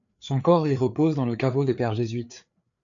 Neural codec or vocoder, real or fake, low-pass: codec, 16 kHz, 4 kbps, FreqCodec, larger model; fake; 7.2 kHz